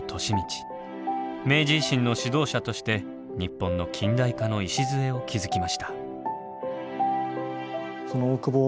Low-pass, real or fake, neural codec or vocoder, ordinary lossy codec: none; real; none; none